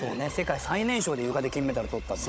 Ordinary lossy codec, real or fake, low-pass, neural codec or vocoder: none; fake; none; codec, 16 kHz, 16 kbps, FunCodec, trained on Chinese and English, 50 frames a second